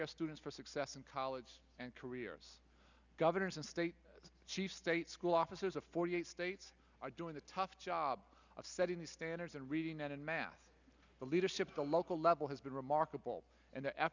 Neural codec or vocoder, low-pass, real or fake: none; 7.2 kHz; real